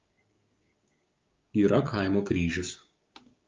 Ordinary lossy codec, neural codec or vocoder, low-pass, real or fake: Opus, 32 kbps; codec, 16 kHz, 6 kbps, DAC; 7.2 kHz; fake